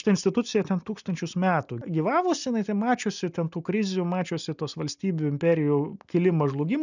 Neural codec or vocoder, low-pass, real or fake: none; 7.2 kHz; real